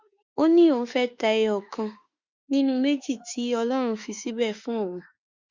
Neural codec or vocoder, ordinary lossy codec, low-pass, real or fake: autoencoder, 48 kHz, 32 numbers a frame, DAC-VAE, trained on Japanese speech; Opus, 64 kbps; 7.2 kHz; fake